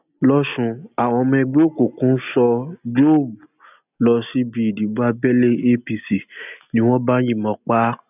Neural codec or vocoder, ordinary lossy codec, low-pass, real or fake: none; none; 3.6 kHz; real